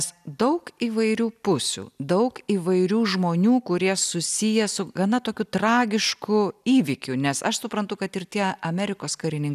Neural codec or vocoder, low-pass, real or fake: none; 14.4 kHz; real